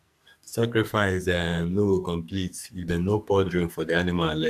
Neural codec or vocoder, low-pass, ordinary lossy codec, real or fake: codec, 44.1 kHz, 2.6 kbps, SNAC; 14.4 kHz; none; fake